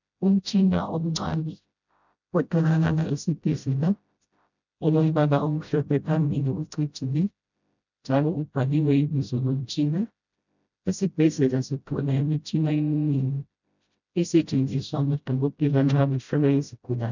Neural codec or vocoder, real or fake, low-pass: codec, 16 kHz, 0.5 kbps, FreqCodec, smaller model; fake; 7.2 kHz